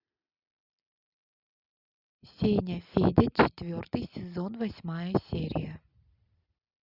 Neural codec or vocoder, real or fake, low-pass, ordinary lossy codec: none; real; 5.4 kHz; Opus, 64 kbps